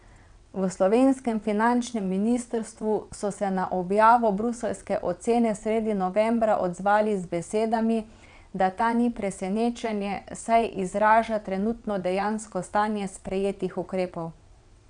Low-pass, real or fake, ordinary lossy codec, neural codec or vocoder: 9.9 kHz; fake; none; vocoder, 22.05 kHz, 80 mel bands, WaveNeXt